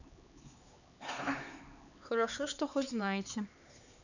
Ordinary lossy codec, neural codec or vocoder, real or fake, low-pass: none; codec, 16 kHz, 4 kbps, X-Codec, HuBERT features, trained on LibriSpeech; fake; 7.2 kHz